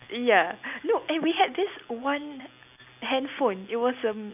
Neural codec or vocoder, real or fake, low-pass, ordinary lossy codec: none; real; 3.6 kHz; none